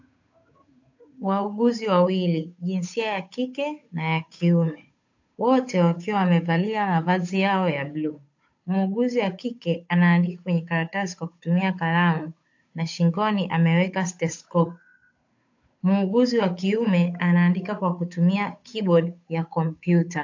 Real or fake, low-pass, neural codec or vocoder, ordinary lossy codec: fake; 7.2 kHz; codec, 16 kHz, 8 kbps, FunCodec, trained on Chinese and English, 25 frames a second; AAC, 48 kbps